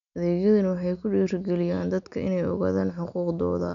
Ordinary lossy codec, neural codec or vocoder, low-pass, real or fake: none; none; 7.2 kHz; real